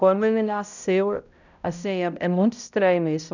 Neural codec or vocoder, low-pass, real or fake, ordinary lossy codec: codec, 16 kHz, 0.5 kbps, X-Codec, HuBERT features, trained on balanced general audio; 7.2 kHz; fake; none